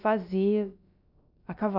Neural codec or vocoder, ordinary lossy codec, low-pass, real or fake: codec, 16 kHz, about 1 kbps, DyCAST, with the encoder's durations; none; 5.4 kHz; fake